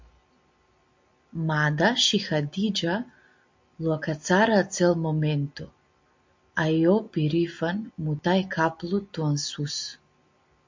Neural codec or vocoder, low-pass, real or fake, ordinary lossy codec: none; 7.2 kHz; real; MP3, 48 kbps